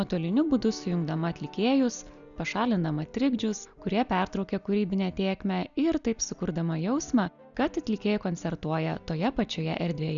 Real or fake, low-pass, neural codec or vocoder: real; 7.2 kHz; none